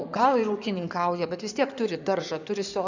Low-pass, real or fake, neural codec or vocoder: 7.2 kHz; fake; codec, 16 kHz in and 24 kHz out, 2.2 kbps, FireRedTTS-2 codec